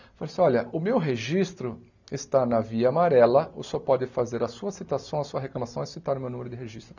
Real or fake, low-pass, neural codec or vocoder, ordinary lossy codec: real; 7.2 kHz; none; none